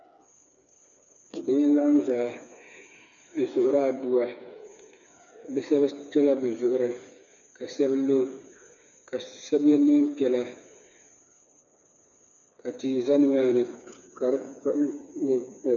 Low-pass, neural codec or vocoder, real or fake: 7.2 kHz; codec, 16 kHz, 4 kbps, FreqCodec, smaller model; fake